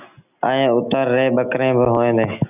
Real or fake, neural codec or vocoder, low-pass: real; none; 3.6 kHz